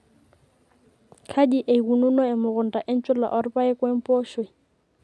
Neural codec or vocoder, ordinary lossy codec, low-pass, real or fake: none; none; none; real